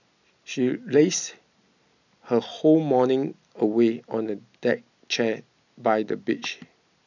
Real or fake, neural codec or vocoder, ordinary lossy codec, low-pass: real; none; none; 7.2 kHz